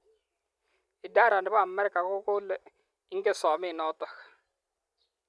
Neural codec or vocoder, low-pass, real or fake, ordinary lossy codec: none; none; real; none